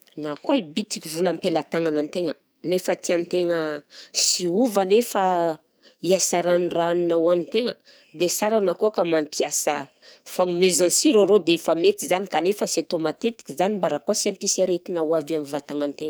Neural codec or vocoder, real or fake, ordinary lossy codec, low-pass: codec, 44.1 kHz, 2.6 kbps, SNAC; fake; none; none